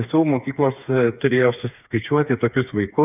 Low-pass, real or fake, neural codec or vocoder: 3.6 kHz; fake; codec, 16 kHz, 4 kbps, FreqCodec, smaller model